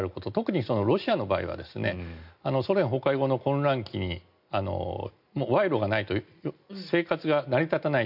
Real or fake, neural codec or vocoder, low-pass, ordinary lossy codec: real; none; 5.4 kHz; none